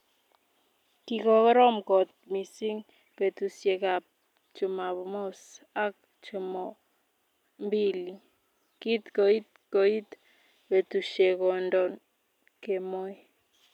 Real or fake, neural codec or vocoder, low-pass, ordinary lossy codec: real; none; 19.8 kHz; none